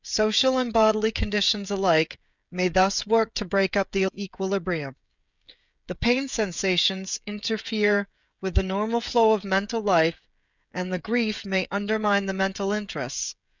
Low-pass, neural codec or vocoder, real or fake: 7.2 kHz; codec, 16 kHz, 16 kbps, FreqCodec, smaller model; fake